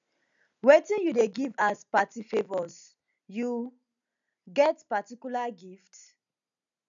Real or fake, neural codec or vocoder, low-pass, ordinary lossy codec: real; none; 7.2 kHz; none